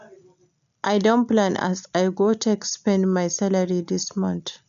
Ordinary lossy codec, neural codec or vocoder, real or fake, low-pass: none; none; real; 7.2 kHz